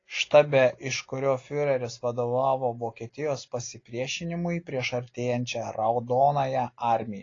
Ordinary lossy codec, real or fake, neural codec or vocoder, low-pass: AAC, 32 kbps; real; none; 7.2 kHz